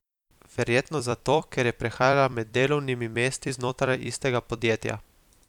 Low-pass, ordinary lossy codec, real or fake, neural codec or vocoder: 19.8 kHz; none; fake; vocoder, 44.1 kHz, 128 mel bands every 256 samples, BigVGAN v2